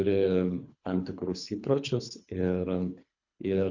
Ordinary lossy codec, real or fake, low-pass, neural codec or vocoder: Opus, 64 kbps; fake; 7.2 kHz; codec, 24 kHz, 3 kbps, HILCodec